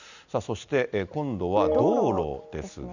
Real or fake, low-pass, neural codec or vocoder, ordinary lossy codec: real; 7.2 kHz; none; none